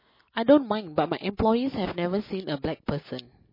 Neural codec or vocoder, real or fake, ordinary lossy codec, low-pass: none; real; MP3, 24 kbps; 5.4 kHz